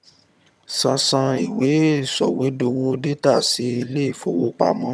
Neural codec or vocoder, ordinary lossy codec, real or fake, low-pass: vocoder, 22.05 kHz, 80 mel bands, HiFi-GAN; none; fake; none